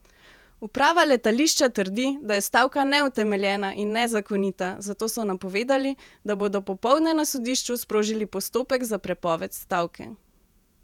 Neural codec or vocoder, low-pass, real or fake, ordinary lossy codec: vocoder, 48 kHz, 128 mel bands, Vocos; 19.8 kHz; fake; none